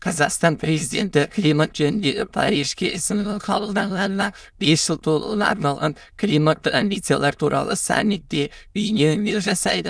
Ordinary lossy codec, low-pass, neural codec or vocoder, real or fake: none; none; autoencoder, 22.05 kHz, a latent of 192 numbers a frame, VITS, trained on many speakers; fake